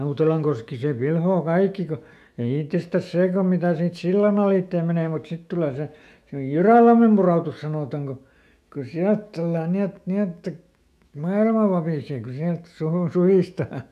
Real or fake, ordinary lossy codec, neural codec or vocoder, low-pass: fake; none; autoencoder, 48 kHz, 128 numbers a frame, DAC-VAE, trained on Japanese speech; 14.4 kHz